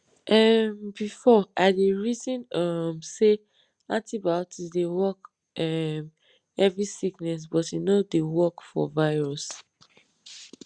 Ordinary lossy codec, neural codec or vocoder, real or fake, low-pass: Opus, 64 kbps; none; real; 9.9 kHz